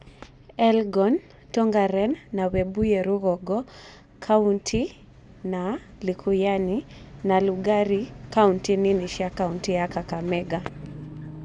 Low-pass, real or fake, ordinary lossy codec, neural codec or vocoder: 10.8 kHz; real; none; none